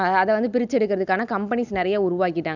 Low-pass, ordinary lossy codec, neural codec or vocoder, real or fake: 7.2 kHz; none; none; real